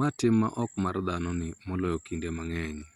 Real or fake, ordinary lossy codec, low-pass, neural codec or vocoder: real; Opus, 64 kbps; 19.8 kHz; none